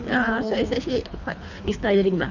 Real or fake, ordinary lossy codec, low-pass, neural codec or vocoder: fake; none; 7.2 kHz; codec, 24 kHz, 3 kbps, HILCodec